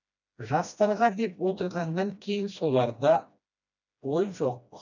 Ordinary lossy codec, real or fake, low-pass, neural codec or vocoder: none; fake; 7.2 kHz; codec, 16 kHz, 1 kbps, FreqCodec, smaller model